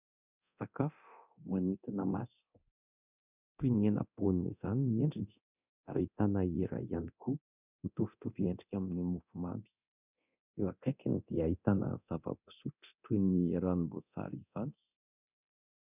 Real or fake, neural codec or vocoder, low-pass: fake; codec, 24 kHz, 0.9 kbps, DualCodec; 3.6 kHz